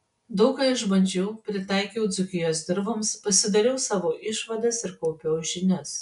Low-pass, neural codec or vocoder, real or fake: 10.8 kHz; none; real